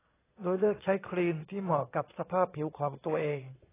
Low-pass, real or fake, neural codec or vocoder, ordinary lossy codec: 3.6 kHz; fake; vocoder, 22.05 kHz, 80 mel bands, Vocos; AAC, 16 kbps